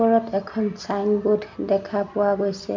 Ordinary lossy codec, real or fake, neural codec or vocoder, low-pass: MP3, 64 kbps; real; none; 7.2 kHz